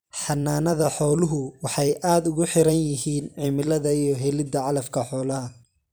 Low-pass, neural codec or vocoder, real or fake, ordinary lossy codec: none; vocoder, 44.1 kHz, 128 mel bands every 256 samples, BigVGAN v2; fake; none